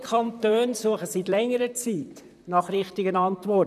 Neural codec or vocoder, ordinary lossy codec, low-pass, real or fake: vocoder, 44.1 kHz, 128 mel bands, Pupu-Vocoder; none; 14.4 kHz; fake